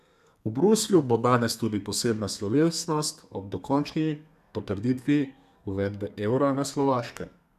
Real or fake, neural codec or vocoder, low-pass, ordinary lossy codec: fake; codec, 32 kHz, 1.9 kbps, SNAC; 14.4 kHz; none